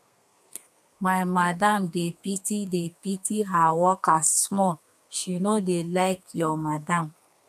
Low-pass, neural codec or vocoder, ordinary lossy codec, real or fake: 14.4 kHz; codec, 32 kHz, 1.9 kbps, SNAC; none; fake